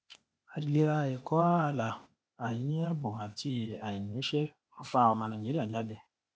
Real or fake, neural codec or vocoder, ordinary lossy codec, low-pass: fake; codec, 16 kHz, 0.8 kbps, ZipCodec; none; none